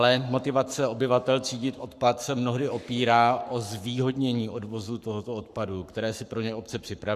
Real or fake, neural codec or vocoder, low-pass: fake; codec, 44.1 kHz, 7.8 kbps, Pupu-Codec; 14.4 kHz